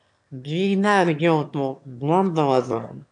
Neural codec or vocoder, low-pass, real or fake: autoencoder, 22.05 kHz, a latent of 192 numbers a frame, VITS, trained on one speaker; 9.9 kHz; fake